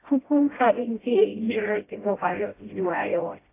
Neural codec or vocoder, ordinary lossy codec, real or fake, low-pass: codec, 16 kHz, 0.5 kbps, FreqCodec, smaller model; AAC, 24 kbps; fake; 3.6 kHz